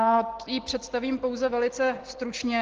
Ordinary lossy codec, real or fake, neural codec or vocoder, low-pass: Opus, 16 kbps; real; none; 7.2 kHz